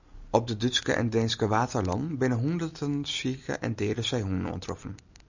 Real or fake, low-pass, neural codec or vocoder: real; 7.2 kHz; none